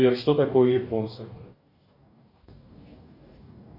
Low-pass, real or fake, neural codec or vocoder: 5.4 kHz; fake; codec, 44.1 kHz, 2.6 kbps, DAC